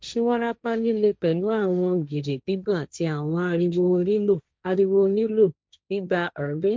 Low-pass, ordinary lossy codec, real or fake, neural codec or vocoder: none; none; fake; codec, 16 kHz, 1.1 kbps, Voila-Tokenizer